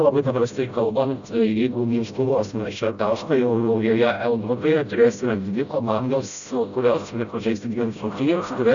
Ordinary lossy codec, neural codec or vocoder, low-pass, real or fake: MP3, 96 kbps; codec, 16 kHz, 0.5 kbps, FreqCodec, smaller model; 7.2 kHz; fake